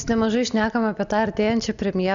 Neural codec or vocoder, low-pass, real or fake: none; 7.2 kHz; real